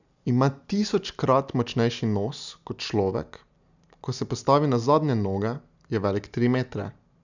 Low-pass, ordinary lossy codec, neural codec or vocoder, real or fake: 7.2 kHz; none; none; real